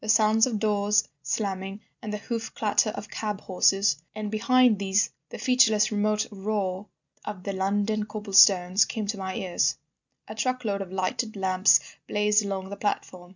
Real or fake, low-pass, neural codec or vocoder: real; 7.2 kHz; none